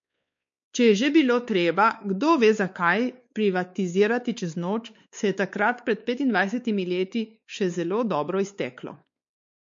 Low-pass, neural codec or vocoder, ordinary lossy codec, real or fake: 7.2 kHz; codec, 16 kHz, 4 kbps, X-Codec, WavLM features, trained on Multilingual LibriSpeech; MP3, 48 kbps; fake